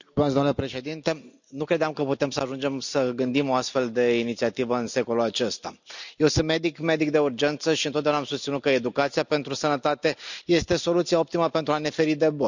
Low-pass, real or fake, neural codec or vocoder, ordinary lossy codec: 7.2 kHz; real; none; none